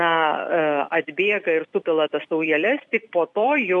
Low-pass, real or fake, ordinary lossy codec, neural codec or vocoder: 10.8 kHz; fake; AAC, 64 kbps; vocoder, 44.1 kHz, 128 mel bands every 256 samples, BigVGAN v2